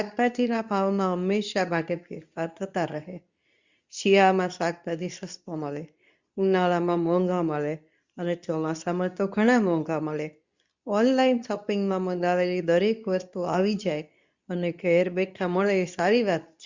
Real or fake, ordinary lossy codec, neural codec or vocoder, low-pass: fake; Opus, 64 kbps; codec, 24 kHz, 0.9 kbps, WavTokenizer, medium speech release version 2; 7.2 kHz